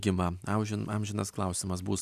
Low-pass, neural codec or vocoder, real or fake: 14.4 kHz; none; real